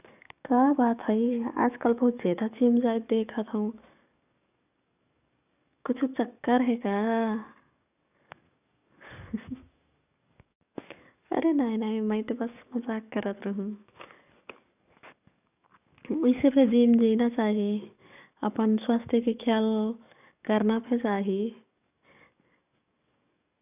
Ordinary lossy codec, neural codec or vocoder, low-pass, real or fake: none; codec, 44.1 kHz, 7.8 kbps, DAC; 3.6 kHz; fake